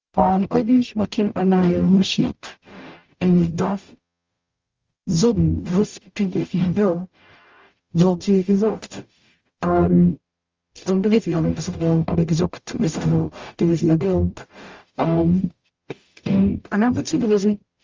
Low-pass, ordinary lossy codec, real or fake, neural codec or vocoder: 7.2 kHz; Opus, 32 kbps; fake; codec, 44.1 kHz, 0.9 kbps, DAC